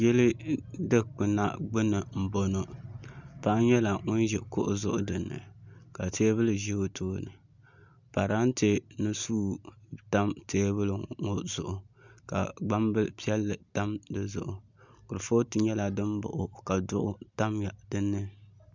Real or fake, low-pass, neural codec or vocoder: real; 7.2 kHz; none